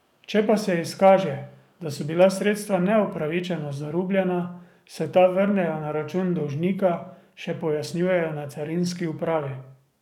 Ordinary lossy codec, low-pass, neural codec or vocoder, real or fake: none; 19.8 kHz; codec, 44.1 kHz, 7.8 kbps, DAC; fake